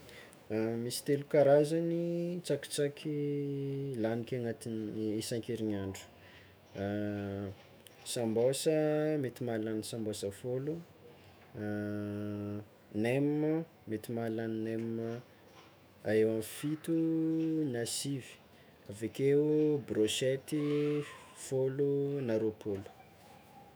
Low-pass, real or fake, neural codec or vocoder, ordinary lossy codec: none; fake; autoencoder, 48 kHz, 128 numbers a frame, DAC-VAE, trained on Japanese speech; none